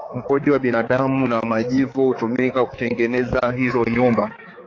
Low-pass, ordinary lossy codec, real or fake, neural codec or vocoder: 7.2 kHz; AAC, 32 kbps; fake; codec, 16 kHz, 4 kbps, X-Codec, HuBERT features, trained on balanced general audio